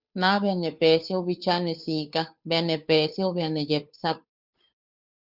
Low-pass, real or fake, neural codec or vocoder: 5.4 kHz; fake; codec, 16 kHz, 2 kbps, FunCodec, trained on Chinese and English, 25 frames a second